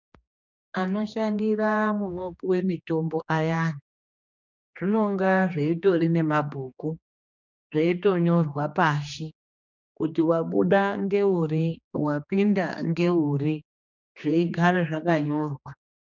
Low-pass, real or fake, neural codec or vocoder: 7.2 kHz; fake; codec, 16 kHz, 2 kbps, X-Codec, HuBERT features, trained on general audio